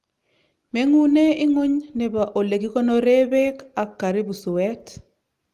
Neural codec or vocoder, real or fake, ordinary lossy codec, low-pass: none; real; Opus, 32 kbps; 14.4 kHz